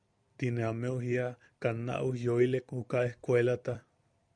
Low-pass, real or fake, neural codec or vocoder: 9.9 kHz; fake; vocoder, 44.1 kHz, 128 mel bands every 256 samples, BigVGAN v2